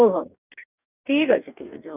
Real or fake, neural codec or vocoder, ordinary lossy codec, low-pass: fake; codec, 44.1 kHz, 2.6 kbps, DAC; none; 3.6 kHz